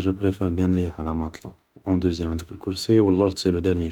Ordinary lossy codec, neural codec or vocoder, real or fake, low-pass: none; autoencoder, 48 kHz, 32 numbers a frame, DAC-VAE, trained on Japanese speech; fake; 19.8 kHz